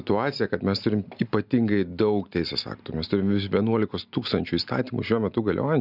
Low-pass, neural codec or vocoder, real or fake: 5.4 kHz; none; real